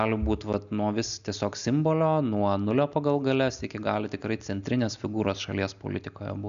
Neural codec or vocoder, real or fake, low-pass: none; real; 7.2 kHz